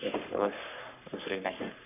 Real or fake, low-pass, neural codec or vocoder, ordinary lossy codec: fake; 3.6 kHz; codec, 44.1 kHz, 3.4 kbps, Pupu-Codec; none